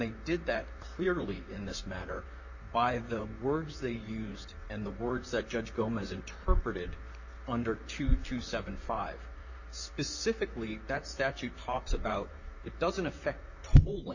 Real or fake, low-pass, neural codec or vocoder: fake; 7.2 kHz; vocoder, 44.1 kHz, 128 mel bands, Pupu-Vocoder